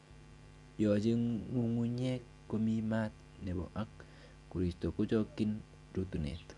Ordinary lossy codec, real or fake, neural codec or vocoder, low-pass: none; real; none; 10.8 kHz